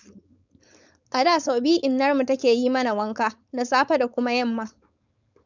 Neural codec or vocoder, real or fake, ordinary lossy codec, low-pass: codec, 16 kHz, 4.8 kbps, FACodec; fake; none; 7.2 kHz